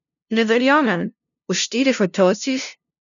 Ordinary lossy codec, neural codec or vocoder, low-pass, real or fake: none; codec, 16 kHz, 0.5 kbps, FunCodec, trained on LibriTTS, 25 frames a second; 7.2 kHz; fake